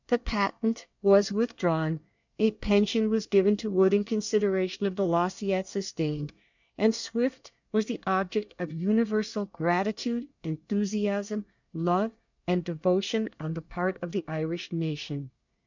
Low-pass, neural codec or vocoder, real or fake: 7.2 kHz; codec, 24 kHz, 1 kbps, SNAC; fake